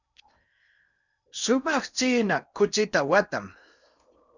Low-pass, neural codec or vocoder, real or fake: 7.2 kHz; codec, 16 kHz in and 24 kHz out, 0.8 kbps, FocalCodec, streaming, 65536 codes; fake